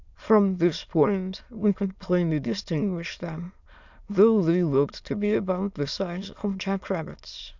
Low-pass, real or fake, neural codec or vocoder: 7.2 kHz; fake; autoencoder, 22.05 kHz, a latent of 192 numbers a frame, VITS, trained on many speakers